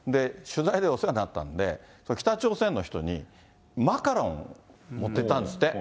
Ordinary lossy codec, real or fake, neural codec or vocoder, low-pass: none; real; none; none